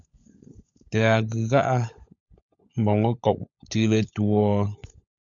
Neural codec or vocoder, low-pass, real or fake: codec, 16 kHz, 16 kbps, FunCodec, trained on LibriTTS, 50 frames a second; 7.2 kHz; fake